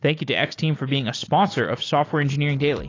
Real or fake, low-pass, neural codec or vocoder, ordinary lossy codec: real; 7.2 kHz; none; AAC, 32 kbps